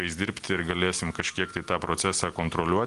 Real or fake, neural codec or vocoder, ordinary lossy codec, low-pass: real; none; Opus, 24 kbps; 10.8 kHz